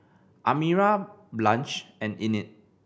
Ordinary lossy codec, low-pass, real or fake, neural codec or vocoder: none; none; real; none